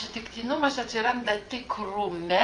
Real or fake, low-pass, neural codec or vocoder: fake; 9.9 kHz; vocoder, 22.05 kHz, 80 mel bands, WaveNeXt